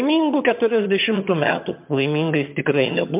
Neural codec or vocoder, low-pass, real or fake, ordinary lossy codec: vocoder, 22.05 kHz, 80 mel bands, HiFi-GAN; 3.6 kHz; fake; MP3, 32 kbps